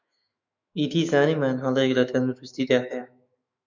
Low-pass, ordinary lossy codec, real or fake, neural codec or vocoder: 7.2 kHz; MP3, 64 kbps; fake; autoencoder, 48 kHz, 128 numbers a frame, DAC-VAE, trained on Japanese speech